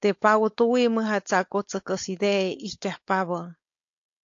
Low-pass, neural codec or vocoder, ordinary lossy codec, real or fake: 7.2 kHz; codec, 16 kHz, 4.8 kbps, FACodec; AAC, 48 kbps; fake